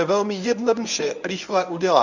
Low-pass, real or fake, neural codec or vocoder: 7.2 kHz; fake; codec, 24 kHz, 0.9 kbps, WavTokenizer, medium speech release version 1